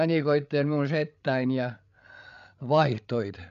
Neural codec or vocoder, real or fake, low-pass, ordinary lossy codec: codec, 16 kHz, 8 kbps, FreqCodec, larger model; fake; 7.2 kHz; none